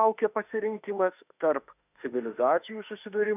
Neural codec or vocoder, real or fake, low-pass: autoencoder, 48 kHz, 32 numbers a frame, DAC-VAE, trained on Japanese speech; fake; 3.6 kHz